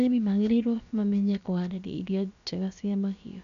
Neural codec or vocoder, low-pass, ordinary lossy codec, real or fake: codec, 16 kHz, about 1 kbps, DyCAST, with the encoder's durations; 7.2 kHz; none; fake